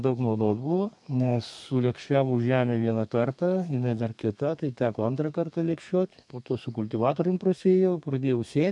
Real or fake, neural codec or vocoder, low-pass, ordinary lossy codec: fake; codec, 32 kHz, 1.9 kbps, SNAC; 10.8 kHz; MP3, 64 kbps